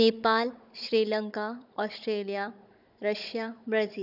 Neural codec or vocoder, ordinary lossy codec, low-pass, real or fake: codec, 16 kHz, 16 kbps, FunCodec, trained on Chinese and English, 50 frames a second; none; 5.4 kHz; fake